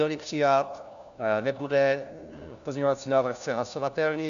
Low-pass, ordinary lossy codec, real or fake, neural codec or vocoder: 7.2 kHz; MP3, 96 kbps; fake; codec, 16 kHz, 1 kbps, FunCodec, trained on LibriTTS, 50 frames a second